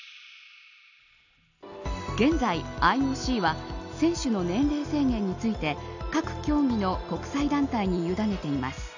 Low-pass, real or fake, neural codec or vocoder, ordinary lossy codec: 7.2 kHz; real; none; none